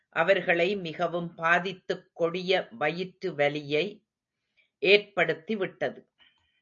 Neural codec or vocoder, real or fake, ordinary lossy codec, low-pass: none; real; AAC, 64 kbps; 7.2 kHz